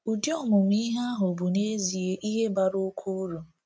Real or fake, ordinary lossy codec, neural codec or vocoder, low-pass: real; none; none; none